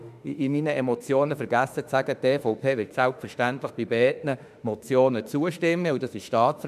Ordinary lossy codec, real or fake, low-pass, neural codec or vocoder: none; fake; 14.4 kHz; autoencoder, 48 kHz, 32 numbers a frame, DAC-VAE, trained on Japanese speech